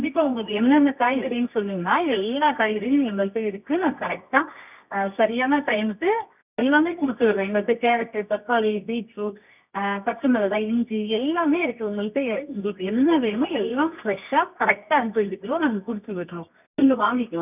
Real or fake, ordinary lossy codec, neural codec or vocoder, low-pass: fake; none; codec, 24 kHz, 0.9 kbps, WavTokenizer, medium music audio release; 3.6 kHz